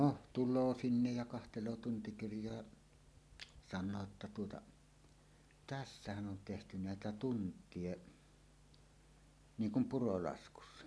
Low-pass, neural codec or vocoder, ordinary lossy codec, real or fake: none; none; none; real